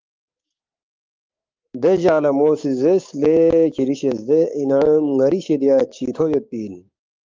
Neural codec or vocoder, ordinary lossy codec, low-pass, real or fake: codec, 16 kHz, 6 kbps, DAC; Opus, 24 kbps; 7.2 kHz; fake